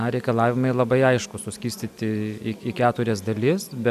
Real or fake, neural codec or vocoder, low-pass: real; none; 14.4 kHz